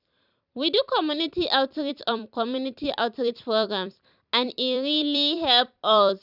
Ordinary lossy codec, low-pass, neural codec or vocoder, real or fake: none; 5.4 kHz; vocoder, 44.1 kHz, 128 mel bands every 256 samples, BigVGAN v2; fake